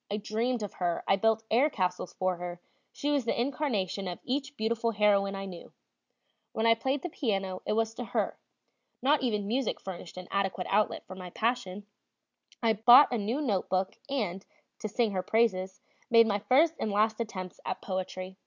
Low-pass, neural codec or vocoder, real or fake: 7.2 kHz; none; real